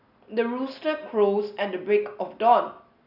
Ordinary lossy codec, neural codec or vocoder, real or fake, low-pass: none; none; real; 5.4 kHz